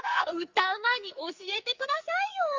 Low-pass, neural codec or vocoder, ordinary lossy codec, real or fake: 7.2 kHz; codec, 44.1 kHz, 2.6 kbps, SNAC; Opus, 32 kbps; fake